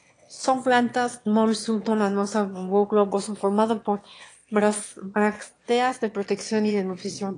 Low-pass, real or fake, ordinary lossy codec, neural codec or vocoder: 9.9 kHz; fake; AAC, 48 kbps; autoencoder, 22.05 kHz, a latent of 192 numbers a frame, VITS, trained on one speaker